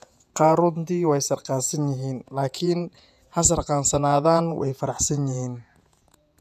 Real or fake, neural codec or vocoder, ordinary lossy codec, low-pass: fake; vocoder, 48 kHz, 128 mel bands, Vocos; none; 14.4 kHz